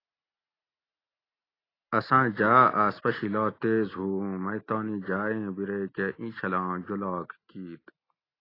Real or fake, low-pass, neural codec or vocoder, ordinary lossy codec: real; 5.4 kHz; none; AAC, 24 kbps